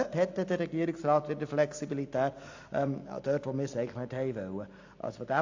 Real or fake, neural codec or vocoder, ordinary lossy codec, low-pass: real; none; AAC, 48 kbps; 7.2 kHz